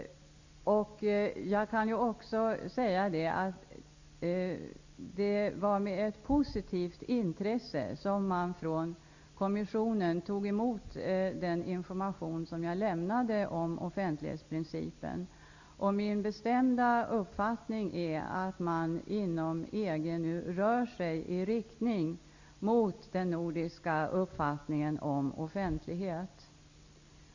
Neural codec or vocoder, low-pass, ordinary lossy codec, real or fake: none; 7.2 kHz; Opus, 64 kbps; real